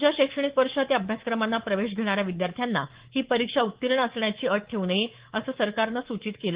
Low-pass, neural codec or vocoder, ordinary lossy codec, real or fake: 3.6 kHz; codec, 24 kHz, 3.1 kbps, DualCodec; Opus, 16 kbps; fake